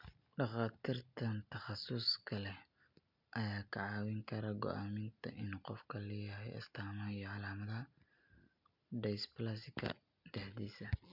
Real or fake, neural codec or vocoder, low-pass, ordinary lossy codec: real; none; 5.4 kHz; MP3, 48 kbps